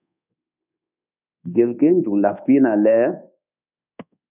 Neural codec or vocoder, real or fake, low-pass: codec, 24 kHz, 1.2 kbps, DualCodec; fake; 3.6 kHz